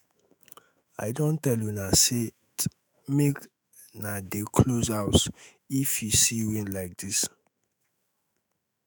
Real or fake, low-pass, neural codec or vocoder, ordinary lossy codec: fake; none; autoencoder, 48 kHz, 128 numbers a frame, DAC-VAE, trained on Japanese speech; none